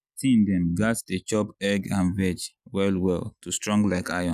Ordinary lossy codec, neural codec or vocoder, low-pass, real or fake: none; none; 14.4 kHz; real